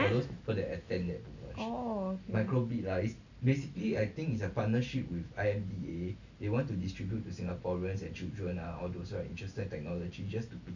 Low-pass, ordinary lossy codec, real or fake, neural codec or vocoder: 7.2 kHz; none; real; none